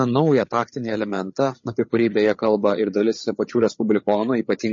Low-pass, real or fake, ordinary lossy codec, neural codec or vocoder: 7.2 kHz; fake; MP3, 32 kbps; codec, 16 kHz, 8 kbps, FreqCodec, larger model